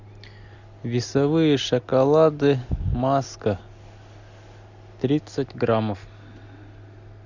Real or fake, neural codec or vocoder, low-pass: real; none; 7.2 kHz